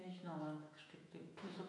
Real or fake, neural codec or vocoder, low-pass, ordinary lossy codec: fake; autoencoder, 48 kHz, 128 numbers a frame, DAC-VAE, trained on Japanese speech; 10.8 kHz; MP3, 48 kbps